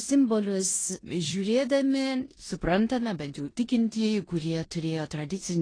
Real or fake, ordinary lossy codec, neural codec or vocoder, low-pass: fake; AAC, 32 kbps; codec, 16 kHz in and 24 kHz out, 0.9 kbps, LongCat-Audio-Codec, four codebook decoder; 9.9 kHz